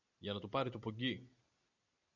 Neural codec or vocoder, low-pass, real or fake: none; 7.2 kHz; real